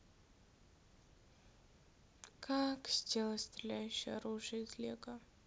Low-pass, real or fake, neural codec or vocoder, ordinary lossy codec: none; real; none; none